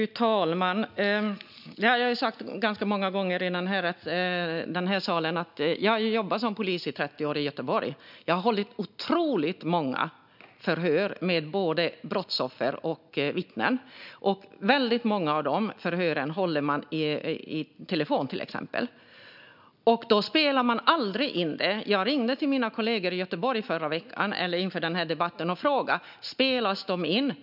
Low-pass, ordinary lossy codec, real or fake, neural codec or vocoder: 5.4 kHz; none; real; none